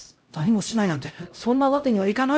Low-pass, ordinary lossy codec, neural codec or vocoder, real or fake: none; none; codec, 16 kHz, 0.5 kbps, X-Codec, HuBERT features, trained on LibriSpeech; fake